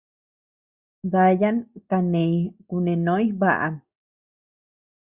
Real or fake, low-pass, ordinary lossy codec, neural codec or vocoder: real; 3.6 kHz; Opus, 64 kbps; none